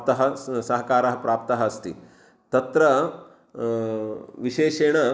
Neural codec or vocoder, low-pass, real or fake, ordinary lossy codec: none; none; real; none